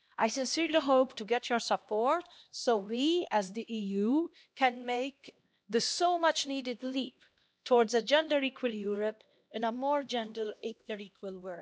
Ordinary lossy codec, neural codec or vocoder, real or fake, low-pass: none; codec, 16 kHz, 1 kbps, X-Codec, HuBERT features, trained on LibriSpeech; fake; none